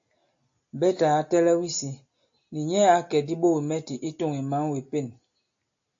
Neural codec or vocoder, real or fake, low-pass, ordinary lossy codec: none; real; 7.2 kHz; AAC, 48 kbps